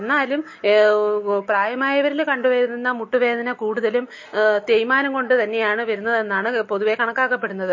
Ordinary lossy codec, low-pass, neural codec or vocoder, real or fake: MP3, 32 kbps; 7.2 kHz; none; real